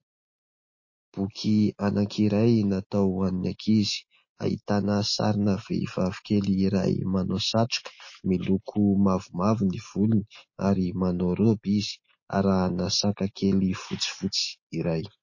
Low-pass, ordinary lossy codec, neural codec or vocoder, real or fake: 7.2 kHz; MP3, 32 kbps; none; real